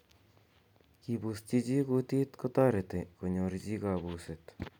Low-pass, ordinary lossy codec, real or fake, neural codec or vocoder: 19.8 kHz; none; real; none